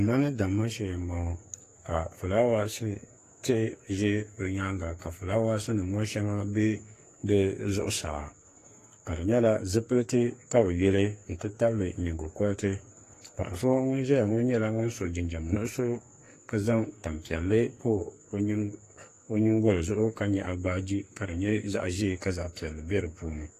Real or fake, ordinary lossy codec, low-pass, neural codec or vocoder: fake; AAC, 48 kbps; 14.4 kHz; codec, 44.1 kHz, 2.6 kbps, SNAC